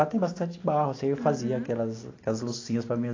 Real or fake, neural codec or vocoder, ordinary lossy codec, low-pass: real; none; AAC, 32 kbps; 7.2 kHz